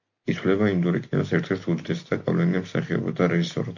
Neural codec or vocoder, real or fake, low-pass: none; real; 7.2 kHz